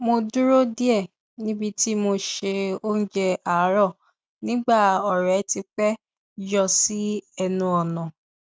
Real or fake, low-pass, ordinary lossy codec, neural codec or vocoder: real; none; none; none